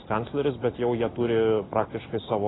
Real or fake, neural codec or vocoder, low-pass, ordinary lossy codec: real; none; 7.2 kHz; AAC, 16 kbps